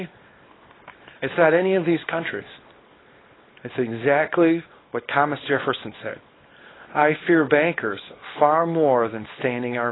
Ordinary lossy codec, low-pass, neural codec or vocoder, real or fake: AAC, 16 kbps; 7.2 kHz; codec, 24 kHz, 0.9 kbps, WavTokenizer, small release; fake